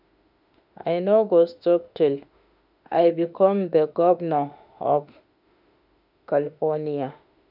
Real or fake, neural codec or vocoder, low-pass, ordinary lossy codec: fake; autoencoder, 48 kHz, 32 numbers a frame, DAC-VAE, trained on Japanese speech; 5.4 kHz; none